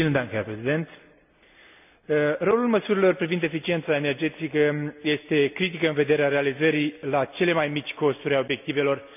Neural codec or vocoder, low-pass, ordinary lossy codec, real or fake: none; 3.6 kHz; none; real